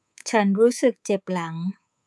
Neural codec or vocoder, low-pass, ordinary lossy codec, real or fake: codec, 24 kHz, 3.1 kbps, DualCodec; none; none; fake